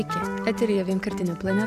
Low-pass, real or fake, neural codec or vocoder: 14.4 kHz; real; none